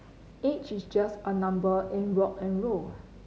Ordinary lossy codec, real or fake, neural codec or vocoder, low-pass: none; real; none; none